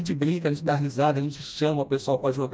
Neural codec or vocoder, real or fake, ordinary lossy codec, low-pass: codec, 16 kHz, 1 kbps, FreqCodec, smaller model; fake; none; none